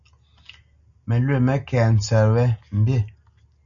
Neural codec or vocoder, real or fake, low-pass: none; real; 7.2 kHz